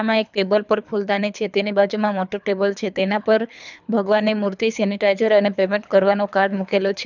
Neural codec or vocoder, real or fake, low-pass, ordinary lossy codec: codec, 24 kHz, 3 kbps, HILCodec; fake; 7.2 kHz; none